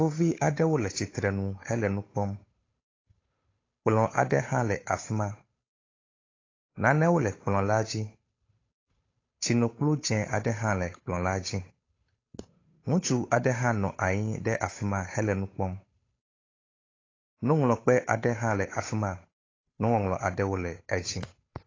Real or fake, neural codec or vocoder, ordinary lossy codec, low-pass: fake; codec, 16 kHz, 8 kbps, FunCodec, trained on Chinese and English, 25 frames a second; AAC, 32 kbps; 7.2 kHz